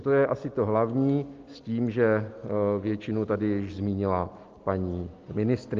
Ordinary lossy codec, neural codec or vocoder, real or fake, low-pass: Opus, 32 kbps; none; real; 7.2 kHz